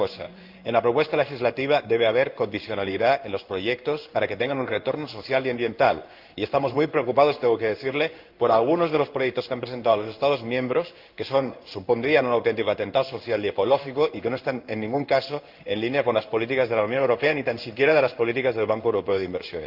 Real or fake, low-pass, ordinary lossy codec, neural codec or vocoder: fake; 5.4 kHz; Opus, 24 kbps; codec, 16 kHz in and 24 kHz out, 1 kbps, XY-Tokenizer